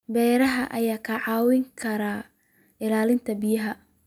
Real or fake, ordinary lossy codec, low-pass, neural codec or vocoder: real; none; 19.8 kHz; none